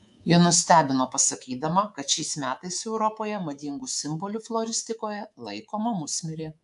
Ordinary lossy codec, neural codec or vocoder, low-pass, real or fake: AAC, 96 kbps; codec, 24 kHz, 3.1 kbps, DualCodec; 10.8 kHz; fake